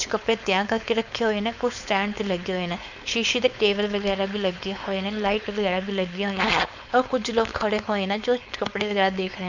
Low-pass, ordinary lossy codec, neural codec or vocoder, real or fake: 7.2 kHz; none; codec, 16 kHz, 4.8 kbps, FACodec; fake